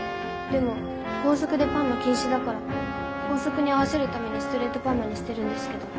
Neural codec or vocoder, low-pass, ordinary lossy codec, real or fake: none; none; none; real